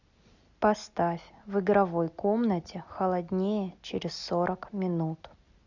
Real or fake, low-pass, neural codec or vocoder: real; 7.2 kHz; none